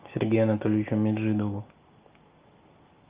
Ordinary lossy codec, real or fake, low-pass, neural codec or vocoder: Opus, 24 kbps; real; 3.6 kHz; none